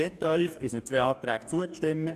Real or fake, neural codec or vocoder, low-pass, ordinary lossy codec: fake; codec, 44.1 kHz, 2.6 kbps, DAC; 14.4 kHz; none